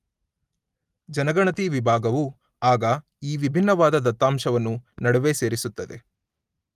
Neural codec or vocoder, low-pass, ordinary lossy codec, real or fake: none; 14.4 kHz; Opus, 32 kbps; real